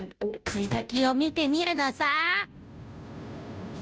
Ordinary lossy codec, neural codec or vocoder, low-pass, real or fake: none; codec, 16 kHz, 0.5 kbps, FunCodec, trained on Chinese and English, 25 frames a second; none; fake